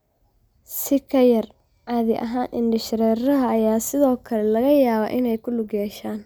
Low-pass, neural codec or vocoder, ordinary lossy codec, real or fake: none; none; none; real